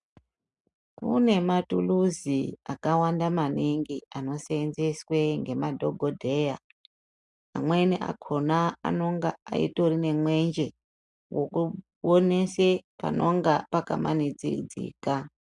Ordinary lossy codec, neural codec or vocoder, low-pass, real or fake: MP3, 96 kbps; none; 10.8 kHz; real